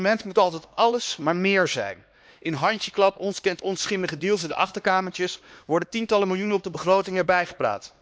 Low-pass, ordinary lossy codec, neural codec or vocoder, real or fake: none; none; codec, 16 kHz, 2 kbps, X-Codec, HuBERT features, trained on LibriSpeech; fake